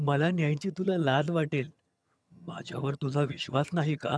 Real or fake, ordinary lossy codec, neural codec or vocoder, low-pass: fake; none; vocoder, 22.05 kHz, 80 mel bands, HiFi-GAN; none